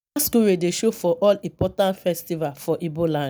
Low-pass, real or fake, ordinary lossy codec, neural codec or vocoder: none; real; none; none